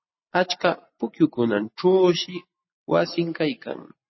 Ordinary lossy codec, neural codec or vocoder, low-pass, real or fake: MP3, 24 kbps; none; 7.2 kHz; real